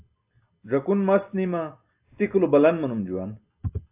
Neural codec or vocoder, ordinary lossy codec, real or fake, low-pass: none; AAC, 32 kbps; real; 3.6 kHz